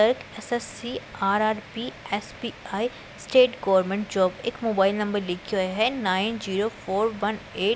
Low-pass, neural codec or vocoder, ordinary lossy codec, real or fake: none; none; none; real